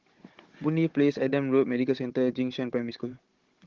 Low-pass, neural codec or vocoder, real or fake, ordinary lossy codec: 7.2 kHz; codec, 16 kHz, 16 kbps, FunCodec, trained on Chinese and English, 50 frames a second; fake; Opus, 16 kbps